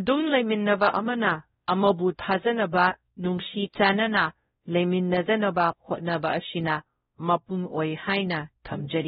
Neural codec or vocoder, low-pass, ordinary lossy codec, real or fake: codec, 16 kHz, 0.5 kbps, X-Codec, WavLM features, trained on Multilingual LibriSpeech; 7.2 kHz; AAC, 16 kbps; fake